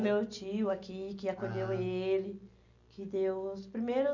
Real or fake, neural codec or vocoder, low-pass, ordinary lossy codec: real; none; 7.2 kHz; none